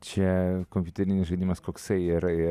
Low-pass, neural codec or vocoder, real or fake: 14.4 kHz; none; real